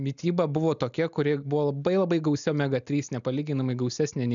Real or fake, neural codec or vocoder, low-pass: real; none; 7.2 kHz